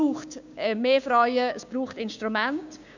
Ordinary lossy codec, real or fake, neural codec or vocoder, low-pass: none; fake; codec, 16 kHz, 6 kbps, DAC; 7.2 kHz